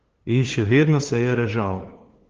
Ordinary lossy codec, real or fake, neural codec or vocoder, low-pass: Opus, 16 kbps; fake; codec, 16 kHz, 2 kbps, FunCodec, trained on LibriTTS, 25 frames a second; 7.2 kHz